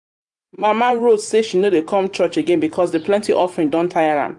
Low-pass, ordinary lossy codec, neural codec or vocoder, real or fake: 10.8 kHz; AAC, 64 kbps; vocoder, 44.1 kHz, 128 mel bands every 512 samples, BigVGAN v2; fake